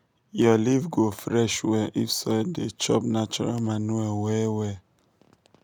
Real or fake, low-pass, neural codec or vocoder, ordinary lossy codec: real; none; none; none